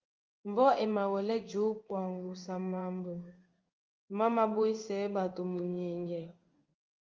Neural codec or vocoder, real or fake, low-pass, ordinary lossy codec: codec, 16 kHz in and 24 kHz out, 1 kbps, XY-Tokenizer; fake; 7.2 kHz; Opus, 24 kbps